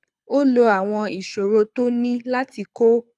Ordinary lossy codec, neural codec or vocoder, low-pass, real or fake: none; codec, 24 kHz, 6 kbps, HILCodec; none; fake